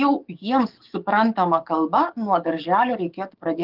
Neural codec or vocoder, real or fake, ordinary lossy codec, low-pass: codec, 44.1 kHz, 7.8 kbps, DAC; fake; Opus, 32 kbps; 5.4 kHz